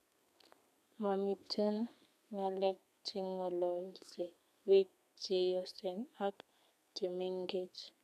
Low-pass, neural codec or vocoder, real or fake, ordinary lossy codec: 14.4 kHz; codec, 32 kHz, 1.9 kbps, SNAC; fake; none